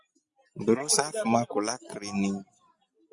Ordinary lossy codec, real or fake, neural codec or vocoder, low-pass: Opus, 64 kbps; real; none; 10.8 kHz